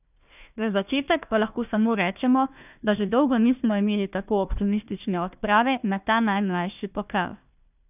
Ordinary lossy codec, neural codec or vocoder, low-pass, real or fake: none; codec, 16 kHz, 1 kbps, FunCodec, trained on Chinese and English, 50 frames a second; 3.6 kHz; fake